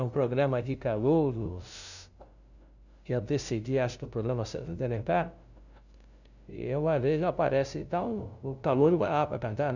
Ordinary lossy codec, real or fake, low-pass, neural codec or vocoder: none; fake; 7.2 kHz; codec, 16 kHz, 0.5 kbps, FunCodec, trained on LibriTTS, 25 frames a second